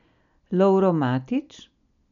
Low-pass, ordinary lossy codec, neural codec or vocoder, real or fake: 7.2 kHz; none; none; real